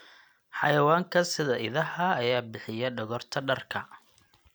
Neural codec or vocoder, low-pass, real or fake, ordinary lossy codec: none; none; real; none